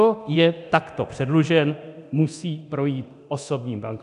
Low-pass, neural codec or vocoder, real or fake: 10.8 kHz; codec, 24 kHz, 0.9 kbps, DualCodec; fake